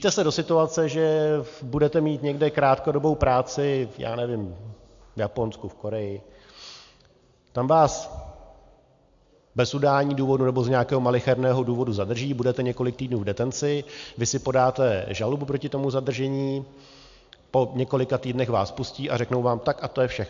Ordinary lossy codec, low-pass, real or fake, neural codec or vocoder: AAC, 64 kbps; 7.2 kHz; real; none